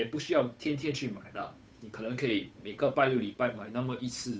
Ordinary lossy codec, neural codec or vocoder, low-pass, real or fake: none; codec, 16 kHz, 8 kbps, FunCodec, trained on Chinese and English, 25 frames a second; none; fake